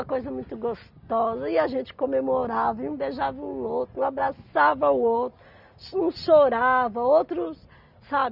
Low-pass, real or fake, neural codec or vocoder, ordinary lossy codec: 5.4 kHz; real; none; none